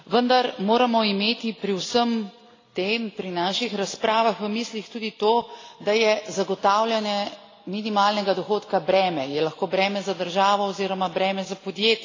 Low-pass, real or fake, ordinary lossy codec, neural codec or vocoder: 7.2 kHz; real; AAC, 32 kbps; none